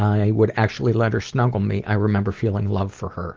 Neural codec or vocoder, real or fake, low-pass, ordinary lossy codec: none; real; 7.2 kHz; Opus, 16 kbps